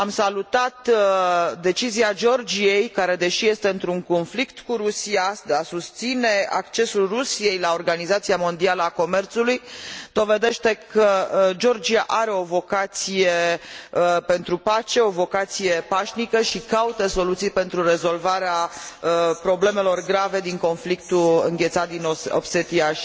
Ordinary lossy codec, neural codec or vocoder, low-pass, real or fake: none; none; none; real